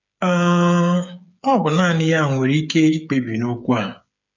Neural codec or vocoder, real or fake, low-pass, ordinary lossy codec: codec, 16 kHz, 8 kbps, FreqCodec, smaller model; fake; 7.2 kHz; none